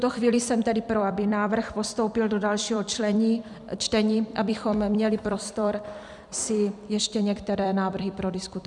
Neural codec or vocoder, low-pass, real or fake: vocoder, 44.1 kHz, 128 mel bands every 256 samples, BigVGAN v2; 10.8 kHz; fake